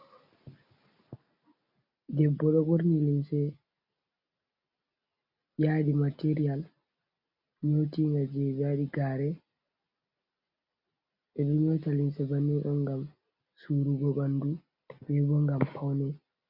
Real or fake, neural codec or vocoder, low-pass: real; none; 5.4 kHz